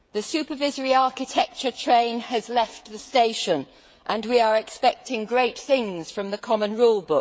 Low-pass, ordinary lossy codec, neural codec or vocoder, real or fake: none; none; codec, 16 kHz, 16 kbps, FreqCodec, smaller model; fake